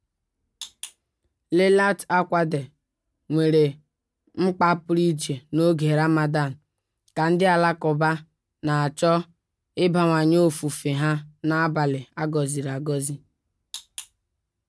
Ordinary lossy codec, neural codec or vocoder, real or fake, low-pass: none; none; real; none